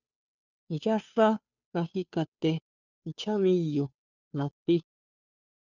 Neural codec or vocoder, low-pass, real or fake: codec, 16 kHz, 2 kbps, FunCodec, trained on Chinese and English, 25 frames a second; 7.2 kHz; fake